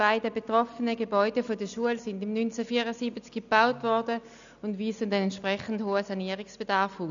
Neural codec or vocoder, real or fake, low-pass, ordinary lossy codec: none; real; 7.2 kHz; none